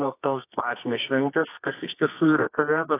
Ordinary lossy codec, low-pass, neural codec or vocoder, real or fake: AAC, 24 kbps; 3.6 kHz; codec, 24 kHz, 0.9 kbps, WavTokenizer, medium music audio release; fake